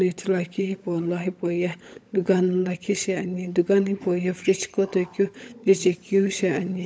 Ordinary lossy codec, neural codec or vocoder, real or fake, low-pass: none; codec, 16 kHz, 16 kbps, FunCodec, trained on LibriTTS, 50 frames a second; fake; none